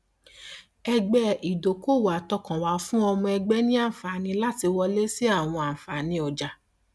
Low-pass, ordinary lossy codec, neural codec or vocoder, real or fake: none; none; none; real